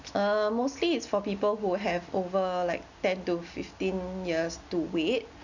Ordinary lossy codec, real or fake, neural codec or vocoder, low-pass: none; real; none; 7.2 kHz